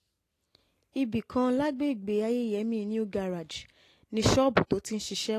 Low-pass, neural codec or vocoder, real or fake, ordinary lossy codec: 14.4 kHz; none; real; AAC, 48 kbps